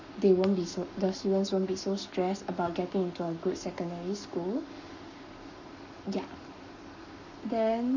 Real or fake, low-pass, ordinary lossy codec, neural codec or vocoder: fake; 7.2 kHz; none; codec, 44.1 kHz, 7.8 kbps, Pupu-Codec